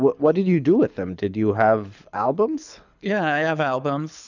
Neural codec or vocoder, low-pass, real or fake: codec, 24 kHz, 6 kbps, HILCodec; 7.2 kHz; fake